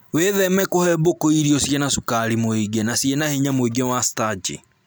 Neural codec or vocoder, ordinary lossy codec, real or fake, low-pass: none; none; real; none